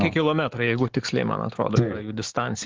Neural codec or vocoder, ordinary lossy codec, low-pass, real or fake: none; Opus, 16 kbps; 7.2 kHz; real